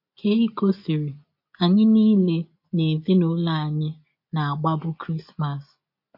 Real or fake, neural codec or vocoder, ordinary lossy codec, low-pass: real; none; MP3, 32 kbps; 5.4 kHz